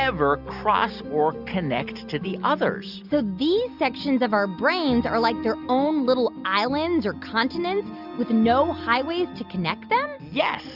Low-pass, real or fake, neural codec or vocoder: 5.4 kHz; real; none